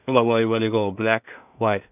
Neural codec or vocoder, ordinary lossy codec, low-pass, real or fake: codec, 16 kHz in and 24 kHz out, 0.4 kbps, LongCat-Audio-Codec, two codebook decoder; none; 3.6 kHz; fake